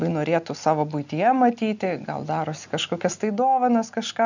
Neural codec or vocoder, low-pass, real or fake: none; 7.2 kHz; real